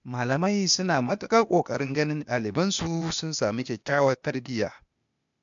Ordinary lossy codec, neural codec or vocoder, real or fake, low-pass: MP3, 64 kbps; codec, 16 kHz, 0.8 kbps, ZipCodec; fake; 7.2 kHz